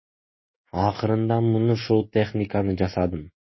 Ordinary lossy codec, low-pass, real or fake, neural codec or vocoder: MP3, 24 kbps; 7.2 kHz; fake; autoencoder, 48 kHz, 128 numbers a frame, DAC-VAE, trained on Japanese speech